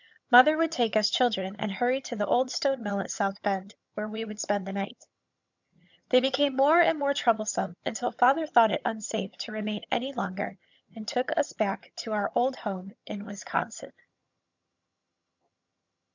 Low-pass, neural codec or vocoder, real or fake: 7.2 kHz; vocoder, 22.05 kHz, 80 mel bands, HiFi-GAN; fake